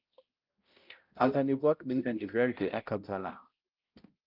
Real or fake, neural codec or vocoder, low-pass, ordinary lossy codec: fake; codec, 16 kHz, 0.5 kbps, X-Codec, HuBERT features, trained on balanced general audio; 5.4 kHz; Opus, 24 kbps